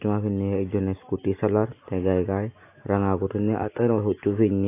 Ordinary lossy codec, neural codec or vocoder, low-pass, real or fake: AAC, 24 kbps; none; 3.6 kHz; real